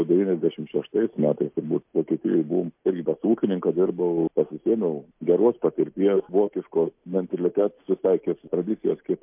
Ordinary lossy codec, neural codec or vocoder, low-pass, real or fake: MP3, 32 kbps; none; 3.6 kHz; real